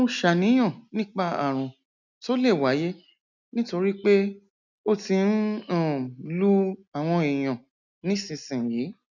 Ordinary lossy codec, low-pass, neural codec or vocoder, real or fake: none; 7.2 kHz; none; real